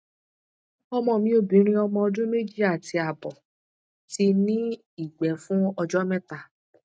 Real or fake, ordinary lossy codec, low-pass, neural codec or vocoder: real; none; none; none